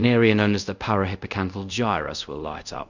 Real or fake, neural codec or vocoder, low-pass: fake; codec, 24 kHz, 0.5 kbps, DualCodec; 7.2 kHz